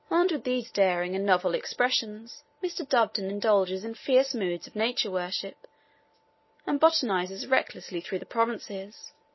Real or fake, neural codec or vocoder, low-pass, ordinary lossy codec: real; none; 7.2 kHz; MP3, 24 kbps